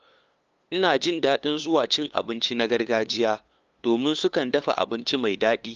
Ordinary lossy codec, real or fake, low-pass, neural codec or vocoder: Opus, 32 kbps; fake; 7.2 kHz; codec, 16 kHz, 2 kbps, FunCodec, trained on LibriTTS, 25 frames a second